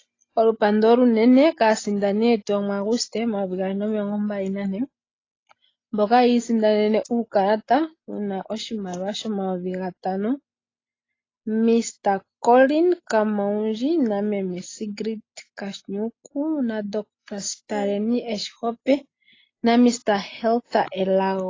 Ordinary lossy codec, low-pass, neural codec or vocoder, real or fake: AAC, 32 kbps; 7.2 kHz; none; real